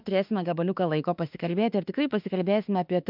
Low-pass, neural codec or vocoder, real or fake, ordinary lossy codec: 5.4 kHz; autoencoder, 48 kHz, 32 numbers a frame, DAC-VAE, trained on Japanese speech; fake; MP3, 48 kbps